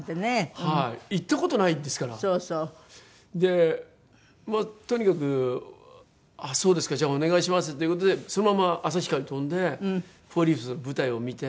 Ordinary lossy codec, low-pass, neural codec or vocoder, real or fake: none; none; none; real